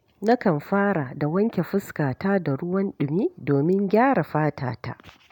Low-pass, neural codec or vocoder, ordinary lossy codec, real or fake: 19.8 kHz; none; none; real